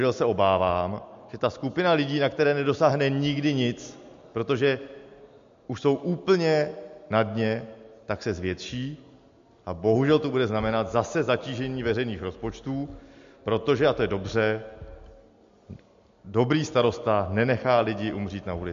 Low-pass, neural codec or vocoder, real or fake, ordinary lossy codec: 7.2 kHz; none; real; MP3, 48 kbps